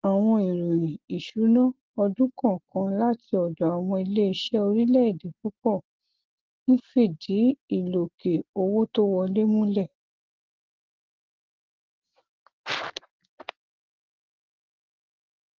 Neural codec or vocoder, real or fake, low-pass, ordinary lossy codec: none; real; 7.2 kHz; Opus, 16 kbps